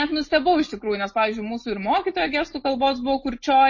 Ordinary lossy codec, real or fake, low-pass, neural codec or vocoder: MP3, 32 kbps; real; 7.2 kHz; none